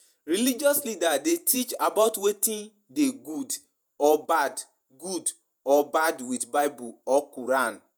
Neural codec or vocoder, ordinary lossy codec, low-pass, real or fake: vocoder, 48 kHz, 128 mel bands, Vocos; none; none; fake